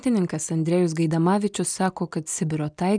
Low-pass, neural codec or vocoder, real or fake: 9.9 kHz; none; real